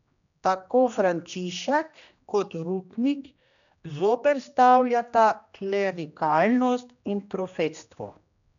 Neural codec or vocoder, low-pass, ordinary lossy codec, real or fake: codec, 16 kHz, 1 kbps, X-Codec, HuBERT features, trained on general audio; 7.2 kHz; none; fake